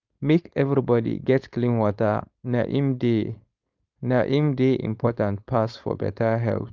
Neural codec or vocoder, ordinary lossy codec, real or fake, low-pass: codec, 16 kHz, 4.8 kbps, FACodec; Opus, 24 kbps; fake; 7.2 kHz